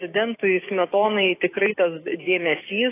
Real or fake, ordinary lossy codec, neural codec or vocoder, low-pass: fake; AAC, 24 kbps; codec, 16 kHz, 6 kbps, DAC; 3.6 kHz